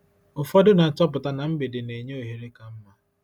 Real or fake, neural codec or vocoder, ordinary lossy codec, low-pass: real; none; none; 19.8 kHz